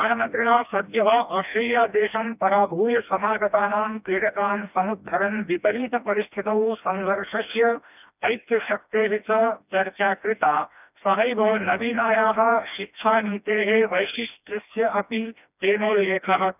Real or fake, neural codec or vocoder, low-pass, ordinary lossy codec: fake; codec, 16 kHz, 1 kbps, FreqCodec, smaller model; 3.6 kHz; none